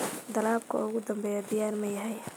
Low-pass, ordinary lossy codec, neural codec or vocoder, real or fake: none; none; none; real